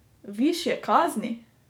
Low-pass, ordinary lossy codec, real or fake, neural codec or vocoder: none; none; fake; codec, 44.1 kHz, 7.8 kbps, DAC